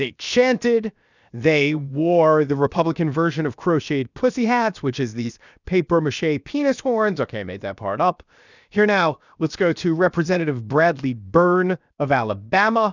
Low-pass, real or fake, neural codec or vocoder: 7.2 kHz; fake; codec, 16 kHz, 0.7 kbps, FocalCodec